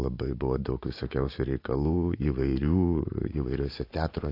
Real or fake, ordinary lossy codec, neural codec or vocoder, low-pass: fake; AAC, 32 kbps; vocoder, 44.1 kHz, 80 mel bands, Vocos; 5.4 kHz